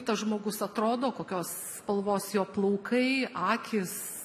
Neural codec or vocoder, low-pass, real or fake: none; 14.4 kHz; real